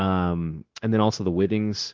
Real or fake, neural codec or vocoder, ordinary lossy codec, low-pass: fake; codec, 16 kHz, 0.9 kbps, LongCat-Audio-Codec; Opus, 16 kbps; 7.2 kHz